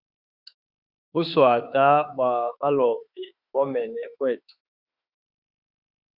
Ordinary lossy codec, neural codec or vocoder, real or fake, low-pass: Opus, 64 kbps; autoencoder, 48 kHz, 32 numbers a frame, DAC-VAE, trained on Japanese speech; fake; 5.4 kHz